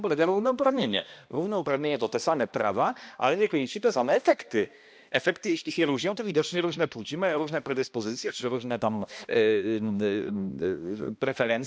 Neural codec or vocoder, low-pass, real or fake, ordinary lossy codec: codec, 16 kHz, 1 kbps, X-Codec, HuBERT features, trained on balanced general audio; none; fake; none